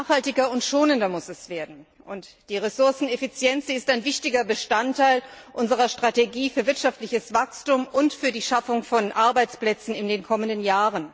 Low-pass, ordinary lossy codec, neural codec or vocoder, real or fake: none; none; none; real